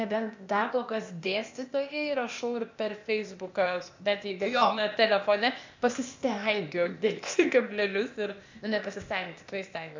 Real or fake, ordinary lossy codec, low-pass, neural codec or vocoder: fake; MP3, 64 kbps; 7.2 kHz; codec, 16 kHz, 0.8 kbps, ZipCodec